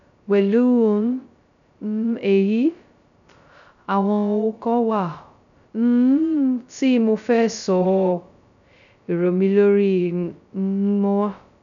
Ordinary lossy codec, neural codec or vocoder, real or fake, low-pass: none; codec, 16 kHz, 0.2 kbps, FocalCodec; fake; 7.2 kHz